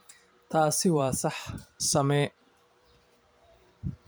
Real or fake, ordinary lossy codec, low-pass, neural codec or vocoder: fake; none; none; vocoder, 44.1 kHz, 128 mel bands every 512 samples, BigVGAN v2